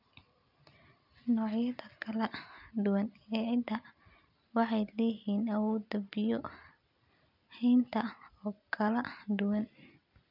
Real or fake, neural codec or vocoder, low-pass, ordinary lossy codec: real; none; 5.4 kHz; none